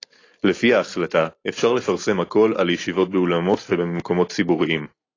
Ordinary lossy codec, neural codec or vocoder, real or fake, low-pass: AAC, 32 kbps; none; real; 7.2 kHz